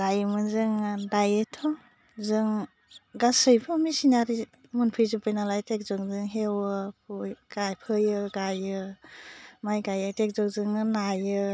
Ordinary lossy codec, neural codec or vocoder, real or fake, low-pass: none; none; real; none